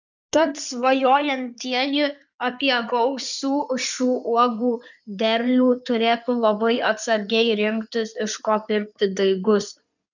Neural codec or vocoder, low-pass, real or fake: codec, 16 kHz in and 24 kHz out, 2.2 kbps, FireRedTTS-2 codec; 7.2 kHz; fake